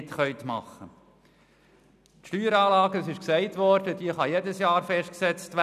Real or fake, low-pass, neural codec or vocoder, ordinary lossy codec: real; 14.4 kHz; none; none